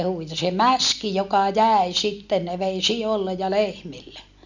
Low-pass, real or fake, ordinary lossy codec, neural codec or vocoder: 7.2 kHz; real; none; none